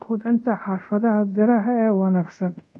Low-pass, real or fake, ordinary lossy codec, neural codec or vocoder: none; fake; none; codec, 24 kHz, 0.5 kbps, DualCodec